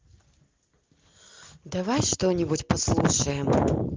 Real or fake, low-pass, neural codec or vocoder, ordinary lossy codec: real; 7.2 kHz; none; Opus, 32 kbps